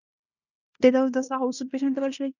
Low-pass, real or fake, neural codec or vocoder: 7.2 kHz; fake; codec, 16 kHz in and 24 kHz out, 0.9 kbps, LongCat-Audio-Codec, fine tuned four codebook decoder